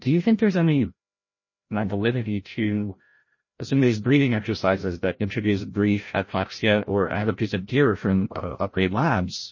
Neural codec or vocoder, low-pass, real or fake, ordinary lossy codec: codec, 16 kHz, 0.5 kbps, FreqCodec, larger model; 7.2 kHz; fake; MP3, 32 kbps